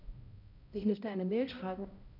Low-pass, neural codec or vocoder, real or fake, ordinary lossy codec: 5.4 kHz; codec, 16 kHz, 0.5 kbps, X-Codec, HuBERT features, trained on balanced general audio; fake; none